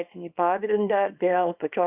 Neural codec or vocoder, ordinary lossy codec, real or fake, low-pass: codec, 24 kHz, 0.9 kbps, WavTokenizer, small release; Opus, 64 kbps; fake; 3.6 kHz